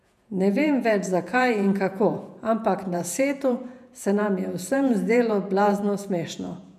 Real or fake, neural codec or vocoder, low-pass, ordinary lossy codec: fake; vocoder, 48 kHz, 128 mel bands, Vocos; 14.4 kHz; none